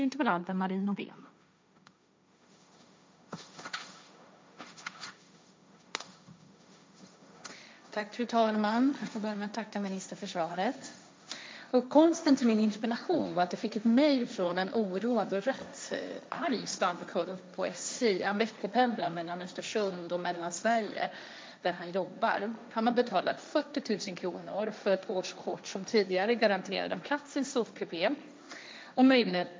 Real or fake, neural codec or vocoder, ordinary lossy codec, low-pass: fake; codec, 16 kHz, 1.1 kbps, Voila-Tokenizer; none; none